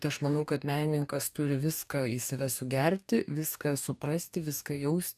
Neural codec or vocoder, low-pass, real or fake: codec, 44.1 kHz, 2.6 kbps, DAC; 14.4 kHz; fake